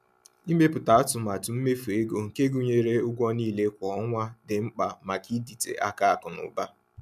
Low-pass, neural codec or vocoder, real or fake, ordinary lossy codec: 14.4 kHz; none; real; none